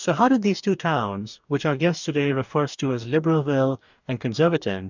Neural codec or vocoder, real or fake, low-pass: codec, 44.1 kHz, 2.6 kbps, DAC; fake; 7.2 kHz